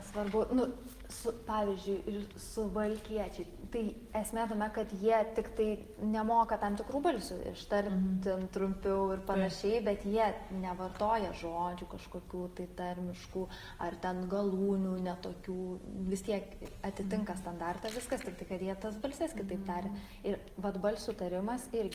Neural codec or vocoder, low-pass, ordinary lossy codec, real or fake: none; 14.4 kHz; Opus, 24 kbps; real